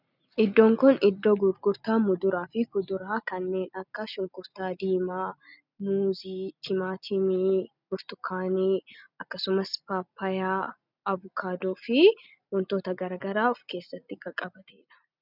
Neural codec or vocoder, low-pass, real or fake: none; 5.4 kHz; real